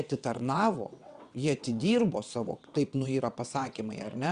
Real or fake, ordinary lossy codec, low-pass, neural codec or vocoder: fake; Opus, 64 kbps; 9.9 kHz; vocoder, 22.05 kHz, 80 mel bands, WaveNeXt